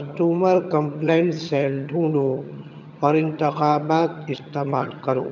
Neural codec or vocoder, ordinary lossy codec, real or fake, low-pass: vocoder, 22.05 kHz, 80 mel bands, HiFi-GAN; none; fake; 7.2 kHz